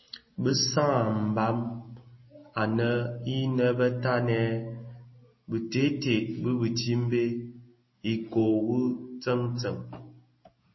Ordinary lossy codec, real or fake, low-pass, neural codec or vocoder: MP3, 24 kbps; real; 7.2 kHz; none